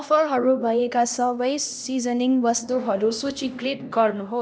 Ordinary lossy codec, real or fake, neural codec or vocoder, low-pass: none; fake; codec, 16 kHz, 1 kbps, X-Codec, HuBERT features, trained on LibriSpeech; none